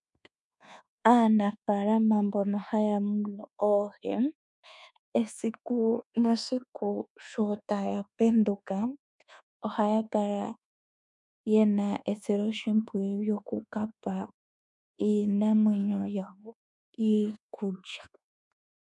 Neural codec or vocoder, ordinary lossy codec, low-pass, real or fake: codec, 24 kHz, 1.2 kbps, DualCodec; MP3, 96 kbps; 10.8 kHz; fake